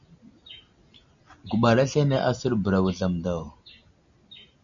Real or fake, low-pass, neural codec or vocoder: real; 7.2 kHz; none